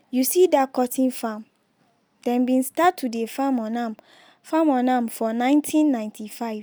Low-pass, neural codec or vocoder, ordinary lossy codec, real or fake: none; none; none; real